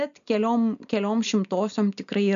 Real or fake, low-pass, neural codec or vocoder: real; 7.2 kHz; none